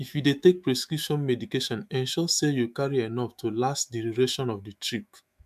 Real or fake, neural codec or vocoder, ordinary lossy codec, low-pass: fake; autoencoder, 48 kHz, 128 numbers a frame, DAC-VAE, trained on Japanese speech; MP3, 96 kbps; 14.4 kHz